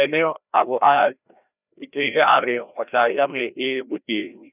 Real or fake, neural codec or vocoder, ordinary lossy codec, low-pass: fake; codec, 16 kHz, 1 kbps, FreqCodec, larger model; none; 3.6 kHz